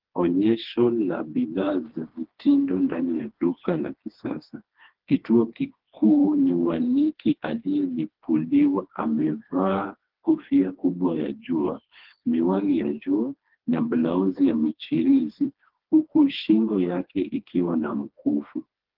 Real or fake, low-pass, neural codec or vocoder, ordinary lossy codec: fake; 5.4 kHz; codec, 16 kHz, 2 kbps, FreqCodec, smaller model; Opus, 16 kbps